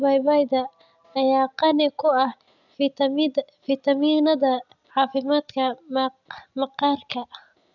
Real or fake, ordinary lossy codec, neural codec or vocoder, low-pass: real; none; none; 7.2 kHz